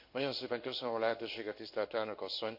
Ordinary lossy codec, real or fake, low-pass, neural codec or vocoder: none; fake; 5.4 kHz; codec, 16 kHz in and 24 kHz out, 1 kbps, XY-Tokenizer